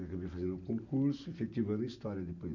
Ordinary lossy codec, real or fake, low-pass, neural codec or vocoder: none; real; 7.2 kHz; none